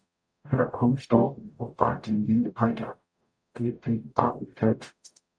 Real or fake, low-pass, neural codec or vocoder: fake; 9.9 kHz; codec, 44.1 kHz, 0.9 kbps, DAC